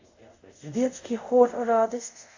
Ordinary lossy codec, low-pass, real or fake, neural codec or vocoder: AAC, 48 kbps; 7.2 kHz; fake; codec, 24 kHz, 0.5 kbps, DualCodec